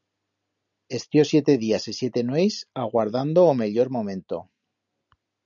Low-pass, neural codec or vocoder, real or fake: 7.2 kHz; none; real